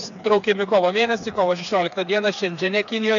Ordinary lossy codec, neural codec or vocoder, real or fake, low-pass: AAC, 64 kbps; codec, 16 kHz, 4 kbps, FreqCodec, smaller model; fake; 7.2 kHz